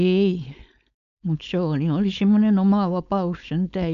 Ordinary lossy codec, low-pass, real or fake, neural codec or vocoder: none; 7.2 kHz; fake; codec, 16 kHz, 4.8 kbps, FACodec